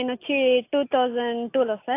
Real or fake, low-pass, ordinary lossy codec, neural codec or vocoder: real; 3.6 kHz; AAC, 24 kbps; none